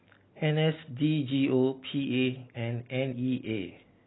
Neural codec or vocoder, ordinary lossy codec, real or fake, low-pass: none; AAC, 16 kbps; real; 7.2 kHz